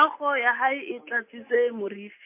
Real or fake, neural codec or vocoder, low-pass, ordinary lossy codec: real; none; 3.6 kHz; MP3, 24 kbps